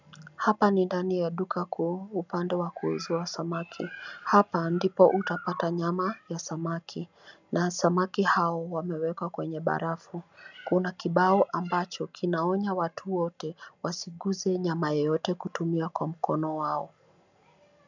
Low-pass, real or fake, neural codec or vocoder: 7.2 kHz; real; none